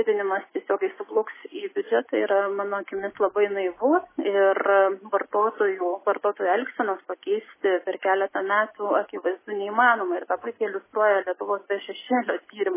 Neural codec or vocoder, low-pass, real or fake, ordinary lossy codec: none; 3.6 kHz; real; MP3, 16 kbps